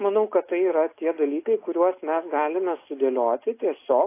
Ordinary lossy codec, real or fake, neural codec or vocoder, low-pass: AAC, 24 kbps; real; none; 3.6 kHz